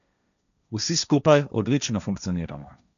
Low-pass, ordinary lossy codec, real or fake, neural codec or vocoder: 7.2 kHz; none; fake; codec, 16 kHz, 1.1 kbps, Voila-Tokenizer